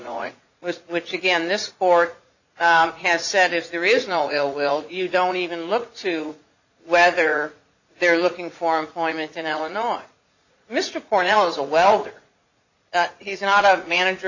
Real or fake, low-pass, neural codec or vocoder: fake; 7.2 kHz; vocoder, 44.1 kHz, 80 mel bands, Vocos